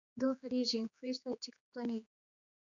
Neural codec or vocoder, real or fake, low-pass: codec, 16 kHz, 4 kbps, X-Codec, HuBERT features, trained on general audio; fake; 7.2 kHz